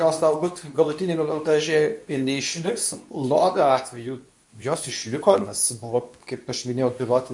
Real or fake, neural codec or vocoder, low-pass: fake; codec, 24 kHz, 0.9 kbps, WavTokenizer, medium speech release version 2; 10.8 kHz